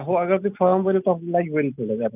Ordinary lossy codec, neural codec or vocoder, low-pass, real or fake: none; none; 3.6 kHz; real